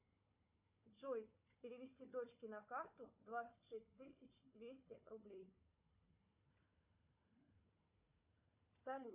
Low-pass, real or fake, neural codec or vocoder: 3.6 kHz; fake; codec, 16 kHz, 4 kbps, FunCodec, trained on Chinese and English, 50 frames a second